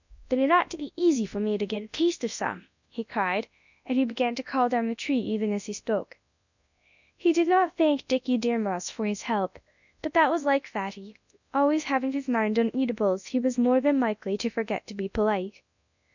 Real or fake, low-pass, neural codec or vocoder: fake; 7.2 kHz; codec, 24 kHz, 0.9 kbps, WavTokenizer, large speech release